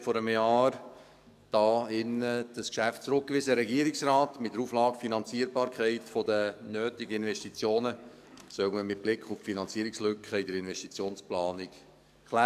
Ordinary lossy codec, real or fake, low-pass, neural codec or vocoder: none; fake; 14.4 kHz; codec, 44.1 kHz, 7.8 kbps, DAC